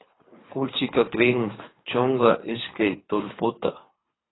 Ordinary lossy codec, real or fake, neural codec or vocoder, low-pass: AAC, 16 kbps; fake; codec, 24 kHz, 3 kbps, HILCodec; 7.2 kHz